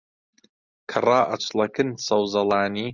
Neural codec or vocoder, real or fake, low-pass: none; real; 7.2 kHz